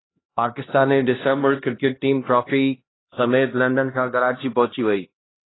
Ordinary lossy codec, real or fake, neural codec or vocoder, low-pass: AAC, 16 kbps; fake; codec, 16 kHz, 1 kbps, X-Codec, HuBERT features, trained on LibriSpeech; 7.2 kHz